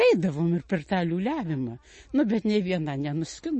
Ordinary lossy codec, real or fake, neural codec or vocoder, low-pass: MP3, 32 kbps; real; none; 10.8 kHz